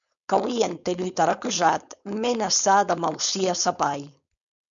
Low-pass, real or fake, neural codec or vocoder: 7.2 kHz; fake; codec, 16 kHz, 4.8 kbps, FACodec